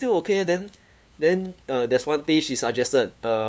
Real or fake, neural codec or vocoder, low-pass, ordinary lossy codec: fake; codec, 16 kHz, 2 kbps, FunCodec, trained on LibriTTS, 25 frames a second; none; none